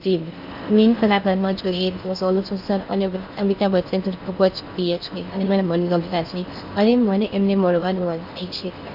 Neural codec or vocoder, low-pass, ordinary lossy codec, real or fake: codec, 16 kHz in and 24 kHz out, 0.6 kbps, FocalCodec, streaming, 4096 codes; 5.4 kHz; none; fake